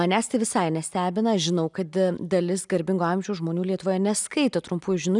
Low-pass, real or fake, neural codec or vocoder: 10.8 kHz; real; none